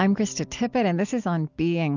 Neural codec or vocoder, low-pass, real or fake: none; 7.2 kHz; real